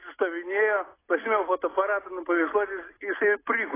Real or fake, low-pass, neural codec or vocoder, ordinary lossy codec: real; 3.6 kHz; none; AAC, 16 kbps